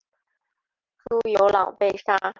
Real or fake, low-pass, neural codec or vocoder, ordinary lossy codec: real; 7.2 kHz; none; Opus, 24 kbps